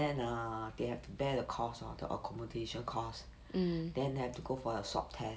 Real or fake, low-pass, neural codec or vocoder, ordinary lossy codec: real; none; none; none